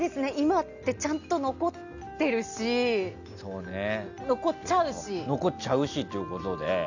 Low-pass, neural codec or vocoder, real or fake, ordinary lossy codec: 7.2 kHz; none; real; none